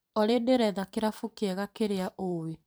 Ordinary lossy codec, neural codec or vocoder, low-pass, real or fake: none; vocoder, 44.1 kHz, 128 mel bands every 512 samples, BigVGAN v2; none; fake